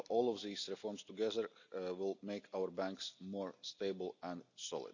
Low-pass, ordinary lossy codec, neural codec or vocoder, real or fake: 7.2 kHz; none; none; real